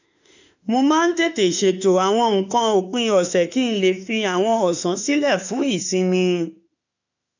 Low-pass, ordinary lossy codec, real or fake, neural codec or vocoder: 7.2 kHz; none; fake; autoencoder, 48 kHz, 32 numbers a frame, DAC-VAE, trained on Japanese speech